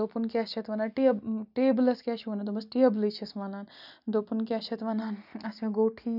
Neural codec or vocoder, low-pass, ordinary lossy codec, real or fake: none; 5.4 kHz; none; real